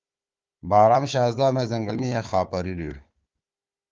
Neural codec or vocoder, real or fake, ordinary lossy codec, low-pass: codec, 16 kHz, 4 kbps, FunCodec, trained on Chinese and English, 50 frames a second; fake; Opus, 32 kbps; 7.2 kHz